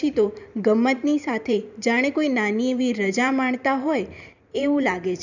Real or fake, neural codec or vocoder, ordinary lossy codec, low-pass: fake; vocoder, 44.1 kHz, 128 mel bands every 512 samples, BigVGAN v2; none; 7.2 kHz